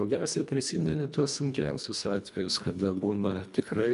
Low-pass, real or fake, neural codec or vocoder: 10.8 kHz; fake; codec, 24 kHz, 1.5 kbps, HILCodec